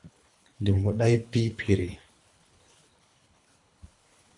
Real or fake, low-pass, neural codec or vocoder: fake; 10.8 kHz; codec, 24 kHz, 3 kbps, HILCodec